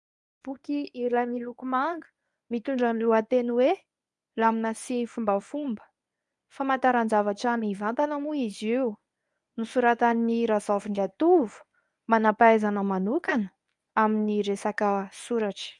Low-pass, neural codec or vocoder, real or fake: 10.8 kHz; codec, 24 kHz, 0.9 kbps, WavTokenizer, medium speech release version 1; fake